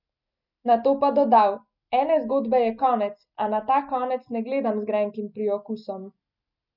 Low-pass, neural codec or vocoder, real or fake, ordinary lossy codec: 5.4 kHz; none; real; none